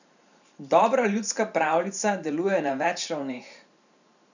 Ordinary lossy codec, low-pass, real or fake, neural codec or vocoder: none; 7.2 kHz; fake; vocoder, 44.1 kHz, 128 mel bands every 512 samples, BigVGAN v2